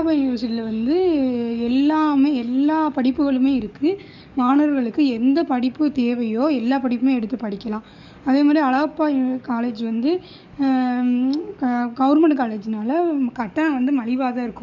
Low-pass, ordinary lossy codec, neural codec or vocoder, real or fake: 7.2 kHz; none; codec, 44.1 kHz, 7.8 kbps, DAC; fake